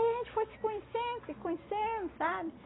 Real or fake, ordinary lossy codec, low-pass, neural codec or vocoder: real; AAC, 16 kbps; 7.2 kHz; none